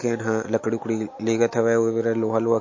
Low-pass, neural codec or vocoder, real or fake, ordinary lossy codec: 7.2 kHz; none; real; MP3, 32 kbps